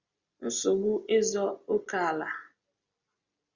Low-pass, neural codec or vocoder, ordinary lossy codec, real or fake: 7.2 kHz; none; Opus, 64 kbps; real